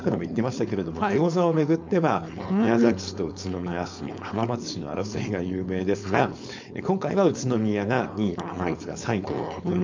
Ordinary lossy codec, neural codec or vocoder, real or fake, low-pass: MP3, 64 kbps; codec, 16 kHz, 4.8 kbps, FACodec; fake; 7.2 kHz